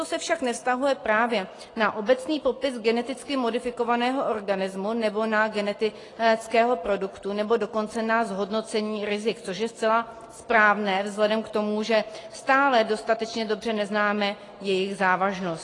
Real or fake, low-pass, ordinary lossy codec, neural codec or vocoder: real; 10.8 kHz; AAC, 32 kbps; none